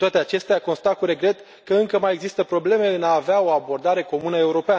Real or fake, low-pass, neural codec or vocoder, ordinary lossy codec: real; none; none; none